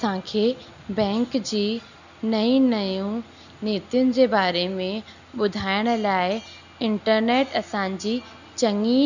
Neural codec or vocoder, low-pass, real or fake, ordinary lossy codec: none; 7.2 kHz; real; none